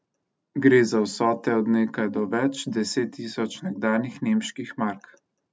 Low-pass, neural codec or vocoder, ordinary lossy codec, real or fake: none; none; none; real